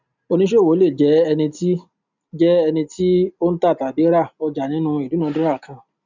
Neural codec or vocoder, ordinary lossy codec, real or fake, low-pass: none; none; real; 7.2 kHz